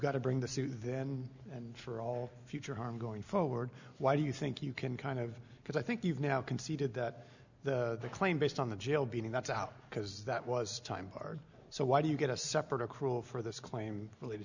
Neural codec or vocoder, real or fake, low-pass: none; real; 7.2 kHz